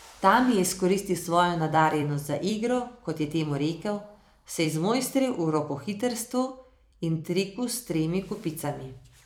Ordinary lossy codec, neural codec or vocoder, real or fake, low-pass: none; vocoder, 44.1 kHz, 128 mel bands every 256 samples, BigVGAN v2; fake; none